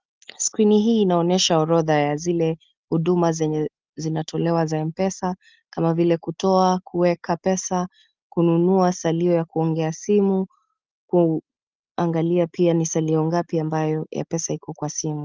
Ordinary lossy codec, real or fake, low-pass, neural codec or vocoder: Opus, 24 kbps; real; 7.2 kHz; none